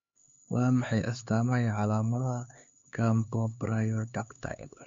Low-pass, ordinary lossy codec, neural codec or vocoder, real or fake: 7.2 kHz; MP3, 48 kbps; codec, 16 kHz, 4 kbps, X-Codec, HuBERT features, trained on LibriSpeech; fake